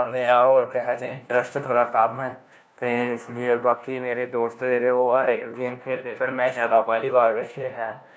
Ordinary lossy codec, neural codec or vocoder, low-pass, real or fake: none; codec, 16 kHz, 1 kbps, FunCodec, trained on LibriTTS, 50 frames a second; none; fake